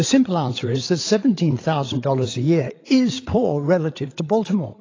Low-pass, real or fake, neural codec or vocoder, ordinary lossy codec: 7.2 kHz; fake; codec, 16 kHz, 8 kbps, FreqCodec, larger model; AAC, 32 kbps